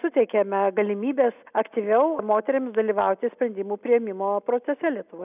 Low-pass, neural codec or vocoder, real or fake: 3.6 kHz; none; real